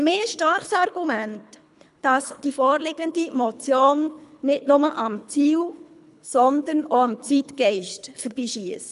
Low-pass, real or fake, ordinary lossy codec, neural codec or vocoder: 10.8 kHz; fake; none; codec, 24 kHz, 3 kbps, HILCodec